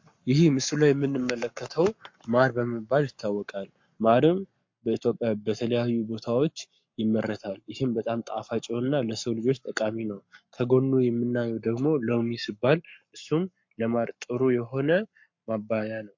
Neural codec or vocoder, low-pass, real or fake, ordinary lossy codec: codec, 44.1 kHz, 7.8 kbps, Pupu-Codec; 7.2 kHz; fake; MP3, 48 kbps